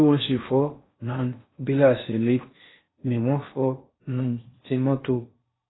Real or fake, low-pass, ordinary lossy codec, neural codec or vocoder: fake; 7.2 kHz; AAC, 16 kbps; codec, 16 kHz in and 24 kHz out, 0.6 kbps, FocalCodec, streaming, 4096 codes